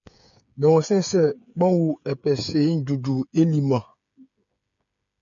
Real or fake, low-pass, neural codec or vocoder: fake; 7.2 kHz; codec, 16 kHz, 8 kbps, FreqCodec, smaller model